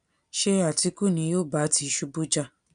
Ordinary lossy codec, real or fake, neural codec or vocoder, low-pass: none; real; none; 9.9 kHz